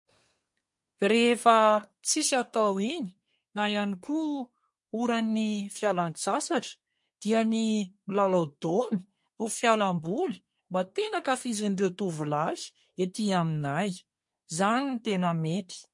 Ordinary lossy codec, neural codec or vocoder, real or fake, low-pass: MP3, 48 kbps; codec, 24 kHz, 1 kbps, SNAC; fake; 10.8 kHz